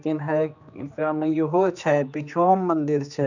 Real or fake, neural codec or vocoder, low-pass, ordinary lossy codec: fake; codec, 16 kHz, 2 kbps, X-Codec, HuBERT features, trained on general audio; 7.2 kHz; none